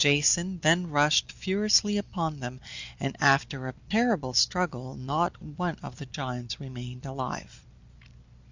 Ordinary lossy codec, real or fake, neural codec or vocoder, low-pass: Opus, 64 kbps; real; none; 7.2 kHz